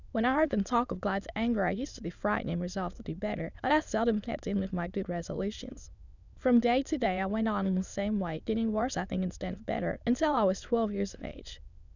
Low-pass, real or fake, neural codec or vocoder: 7.2 kHz; fake; autoencoder, 22.05 kHz, a latent of 192 numbers a frame, VITS, trained on many speakers